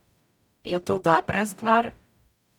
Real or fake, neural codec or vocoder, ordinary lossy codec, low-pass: fake; codec, 44.1 kHz, 0.9 kbps, DAC; none; 19.8 kHz